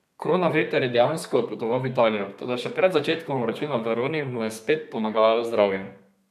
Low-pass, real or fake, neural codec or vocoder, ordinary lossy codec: 14.4 kHz; fake; codec, 32 kHz, 1.9 kbps, SNAC; none